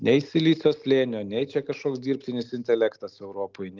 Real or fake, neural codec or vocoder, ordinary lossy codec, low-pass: real; none; Opus, 32 kbps; 7.2 kHz